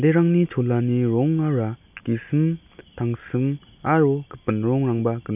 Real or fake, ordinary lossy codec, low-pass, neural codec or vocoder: real; none; 3.6 kHz; none